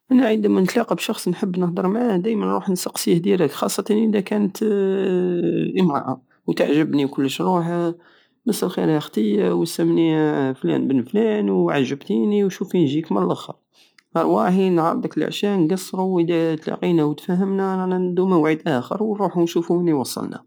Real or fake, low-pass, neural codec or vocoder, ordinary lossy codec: real; none; none; none